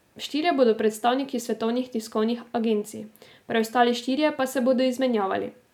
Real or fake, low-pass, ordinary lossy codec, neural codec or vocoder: real; 19.8 kHz; none; none